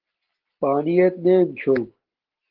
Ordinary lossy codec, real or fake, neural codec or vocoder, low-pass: Opus, 16 kbps; real; none; 5.4 kHz